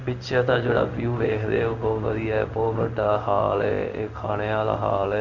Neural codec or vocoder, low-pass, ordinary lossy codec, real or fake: codec, 16 kHz in and 24 kHz out, 1 kbps, XY-Tokenizer; 7.2 kHz; none; fake